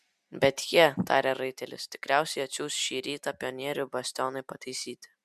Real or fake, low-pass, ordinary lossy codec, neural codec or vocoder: real; 14.4 kHz; MP3, 96 kbps; none